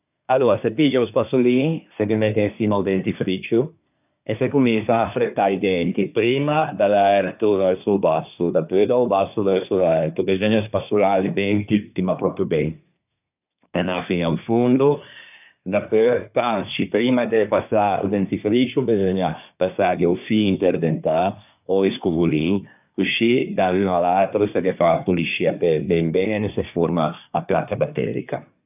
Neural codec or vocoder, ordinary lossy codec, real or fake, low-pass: codec, 24 kHz, 1 kbps, SNAC; none; fake; 3.6 kHz